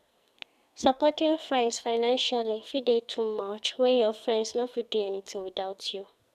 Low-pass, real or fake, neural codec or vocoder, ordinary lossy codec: 14.4 kHz; fake; codec, 44.1 kHz, 2.6 kbps, SNAC; none